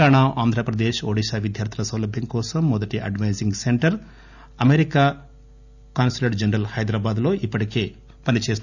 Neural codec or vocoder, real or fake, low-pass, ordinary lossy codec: none; real; 7.2 kHz; none